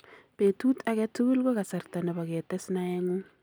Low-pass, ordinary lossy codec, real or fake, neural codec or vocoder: none; none; real; none